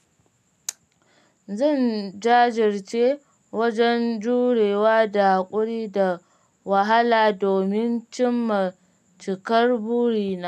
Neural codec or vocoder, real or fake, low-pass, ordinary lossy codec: none; real; 14.4 kHz; none